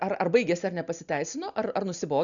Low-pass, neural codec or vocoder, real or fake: 7.2 kHz; none; real